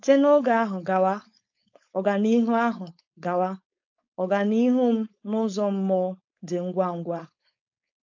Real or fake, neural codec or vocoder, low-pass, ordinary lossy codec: fake; codec, 16 kHz, 4.8 kbps, FACodec; 7.2 kHz; AAC, 48 kbps